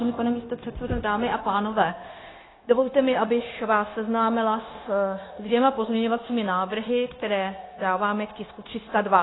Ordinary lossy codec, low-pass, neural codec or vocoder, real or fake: AAC, 16 kbps; 7.2 kHz; codec, 16 kHz, 0.9 kbps, LongCat-Audio-Codec; fake